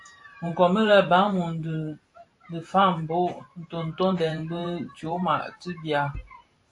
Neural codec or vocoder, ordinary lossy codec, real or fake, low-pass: vocoder, 44.1 kHz, 128 mel bands every 512 samples, BigVGAN v2; AAC, 48 kbps; fake; 10.8 kHz